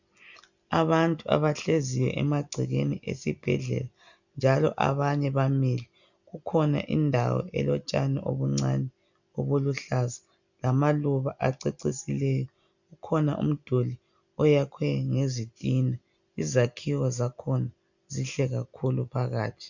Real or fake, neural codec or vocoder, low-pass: real; none; 7.2 kHz